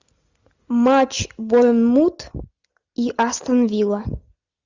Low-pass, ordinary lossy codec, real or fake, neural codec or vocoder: 7.2 kHz; Opus, 64 kbps; real; none